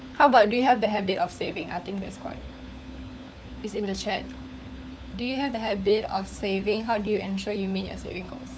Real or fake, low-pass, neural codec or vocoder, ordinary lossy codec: fake; none; codec, 16 kHz, 4 kbps, FunCodec, trained on LibriTTS, 50 frames a second; none